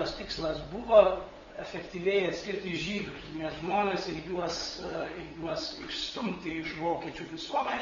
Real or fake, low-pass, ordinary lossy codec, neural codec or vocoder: fake; 7.2 kHz; AAC, 24 kbps; codec, 16 kHz, 8 kbps, FunCodec, trained on LibriTTS, 25 frames a second